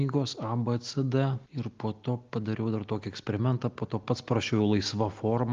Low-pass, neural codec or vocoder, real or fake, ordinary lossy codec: 7.2 kHz; none; real; Opus, 32 kbps